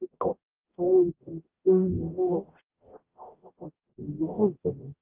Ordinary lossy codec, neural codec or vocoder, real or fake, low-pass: Opus, 24 kbps; codec, 44.1 kHz, 0.9 kbps, DAC; fake; 3.6 kHz